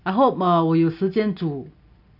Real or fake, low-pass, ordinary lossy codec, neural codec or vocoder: real; 5.4 kHz; none; none